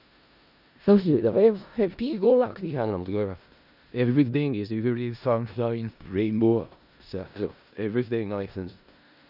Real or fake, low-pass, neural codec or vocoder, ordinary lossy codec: fake; 5.4 kHz; codec, 16 kHz in and 24 kHz out, 0.4 kbps, LongCat-Audio-Codec, four codebook decoder; none